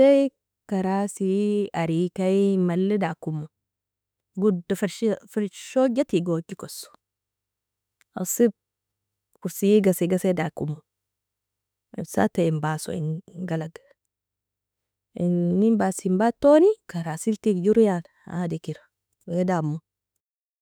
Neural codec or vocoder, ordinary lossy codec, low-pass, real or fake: autoencoder, 48 kHz, 32 numbers a frame, DAC-VAE, trained on Japanese speech; none; none; fake